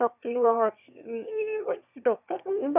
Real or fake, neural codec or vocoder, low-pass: fake; autoencoder, 22.05 kHz, a latent of 192 numbers a frame, VITS, trained on one speaker; 3.6 kHz